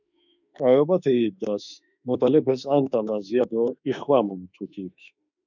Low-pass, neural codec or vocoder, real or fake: 7.2 kHz; autoencoder, 48 kHz, 32 numbers a frame, DAC-VAE, trained on Japanese speech; fake